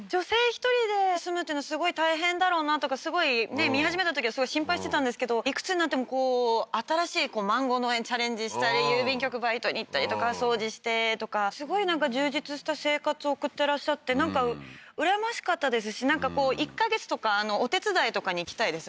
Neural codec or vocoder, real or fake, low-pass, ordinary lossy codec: none; real; none; none